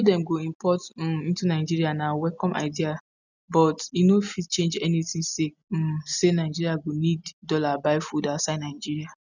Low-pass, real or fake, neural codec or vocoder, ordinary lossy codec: 7.2 kHz; real; none; none